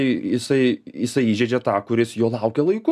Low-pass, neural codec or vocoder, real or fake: 14.4 kHz; none; real